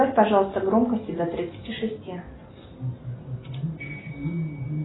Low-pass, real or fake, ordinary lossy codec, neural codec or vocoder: 7.2 kHz; real; AAC, 16 kbps; none